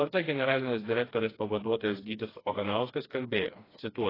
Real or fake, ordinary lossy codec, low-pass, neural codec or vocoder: fake; AAC, 24 kbps; 5.4 kHz; codec, 16 kHz, 2 kbps, FreqCodec, smaller model